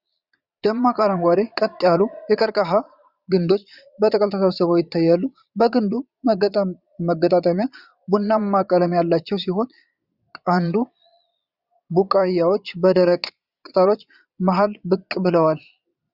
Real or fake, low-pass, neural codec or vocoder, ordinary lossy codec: fake; 5.4 kHz; vocoder, 22.05 kHz, 80 mel bands, Vocos; Opus, 64 kbps